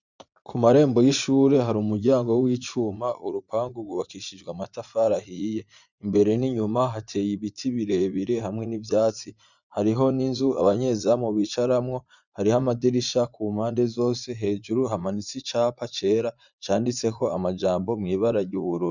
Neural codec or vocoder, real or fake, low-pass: vocoder, 44.1 kHz, 80 mel bands, Vocos; fake; 7.2 kHz